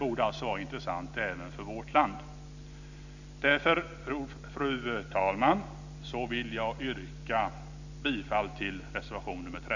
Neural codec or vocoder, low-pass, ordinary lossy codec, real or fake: none; 7.2 kHz; none; real